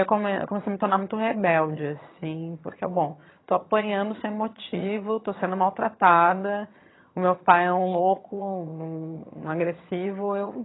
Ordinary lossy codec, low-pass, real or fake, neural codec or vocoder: AAC, 16 kbps; 7.2 kHz; fake; vocoder, 22.05 kHz, 80 mel bands, HiFi-GAN